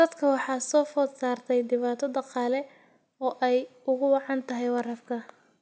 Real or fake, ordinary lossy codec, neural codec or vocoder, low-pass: real; none; none; none